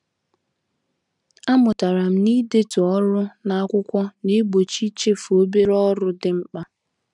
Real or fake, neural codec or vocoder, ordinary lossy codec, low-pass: real; none; none; 10.8 kHz